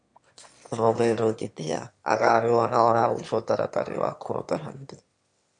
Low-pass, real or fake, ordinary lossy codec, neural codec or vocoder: 9.9 kHz; fake; MP3, 64 kbps; autoencoder, 22.05 kHz, a latent of 192 numbers a frame, VITS, trained on one speaker